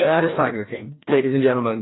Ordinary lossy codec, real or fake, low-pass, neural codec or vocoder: AAC, 16 kbps; fake; 7.2 kHz; codec, 16 kHz, 1 kbps, FreqCodec, larger model